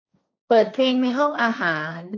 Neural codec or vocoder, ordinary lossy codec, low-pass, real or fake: codec, 16 kHz, 1.1 kbps, Voila-Tokenizer; MP3, 64 kbps; 7.2 kHz; fake